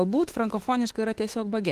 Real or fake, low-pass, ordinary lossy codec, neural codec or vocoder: fake; 14.4 kHz; Opus, 16 kbps; autoencoder, 48 kHz, 32 numbers a frame, DAC-VAE, trained on Japanese speech